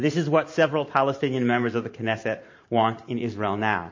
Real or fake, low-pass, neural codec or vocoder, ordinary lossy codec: fake; 7.2 kHz; vocoder, 44.1 kHz, 128 mel bands every 512 samples, BigVGAN v2; MP3, 32 kbps